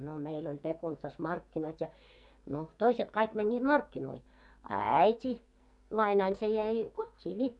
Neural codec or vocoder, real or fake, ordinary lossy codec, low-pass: codec, 44.1 kHz, 2.6 kbps, SNAC; fake; MP3, 96 kbps; 10.8 kHz